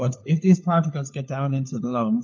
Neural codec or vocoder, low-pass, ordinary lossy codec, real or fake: codec, 16 kHz, 4 kbps, FunCodec, trained on LibriTTS, 50 frames a second; 7.2 kHz; MP3, 48 kbps; fake